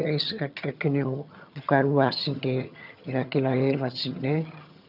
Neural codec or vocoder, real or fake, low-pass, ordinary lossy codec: vocoder, 22.05 kHz, 80 mel bands, HiFi-GAN; fake; 5.4 kHz; none